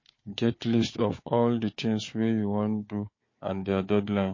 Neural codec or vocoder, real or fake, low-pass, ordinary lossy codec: codec, 16 kHz, 4 kbps, FunCodec, trained on Chinese and English, 50 frames a second; fake; 7.2 kHz; MP3, 32 kbps